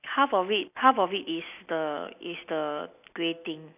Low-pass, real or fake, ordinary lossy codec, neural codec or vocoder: 3.6 kHz; real; none; none